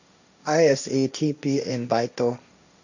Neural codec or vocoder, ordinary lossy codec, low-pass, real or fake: codec, 16 kHz, 1.1 kbps, Voila-Tokenizer; none; 7.2 kHz; fake